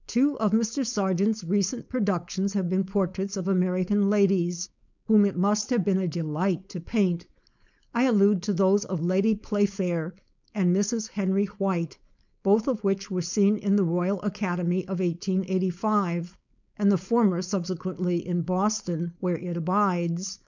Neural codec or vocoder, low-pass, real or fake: codec, 16 kHz, 4.8 kbps, FACodec; 7.2 kHz; fake